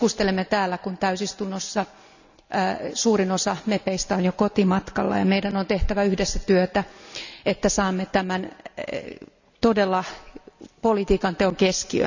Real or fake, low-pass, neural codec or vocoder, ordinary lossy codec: real; 7.2 kHz; none; none